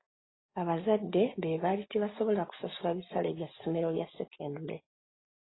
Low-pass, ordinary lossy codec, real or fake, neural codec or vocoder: 7.2 kHz; AAC, 16 kbps; real; none